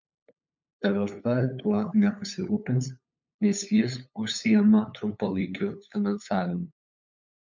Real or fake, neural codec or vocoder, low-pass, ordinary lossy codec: fake; codec, 16 kHz, 8 kbps, FunCodec, trained on LibriTTS, 25 frames a second; 7.2 kHz; MP3, 64 kbps